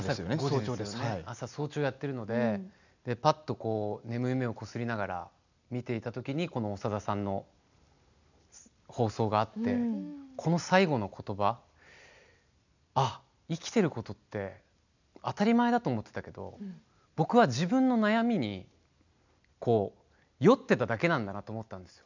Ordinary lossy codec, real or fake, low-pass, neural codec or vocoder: none; real; 7.2 kHz; none